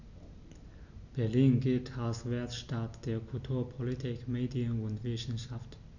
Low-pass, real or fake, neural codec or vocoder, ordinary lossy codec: 7.2 kHz; real; none; none